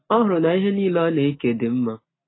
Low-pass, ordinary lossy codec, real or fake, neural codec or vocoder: 7.2 kHz; AAC, 16 kbps; real; none